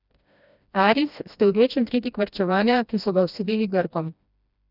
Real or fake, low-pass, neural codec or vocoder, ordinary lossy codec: fake; 5.4 kHz; codec, 16 kHz, 1 kbps, FreqCodec, smaller model; none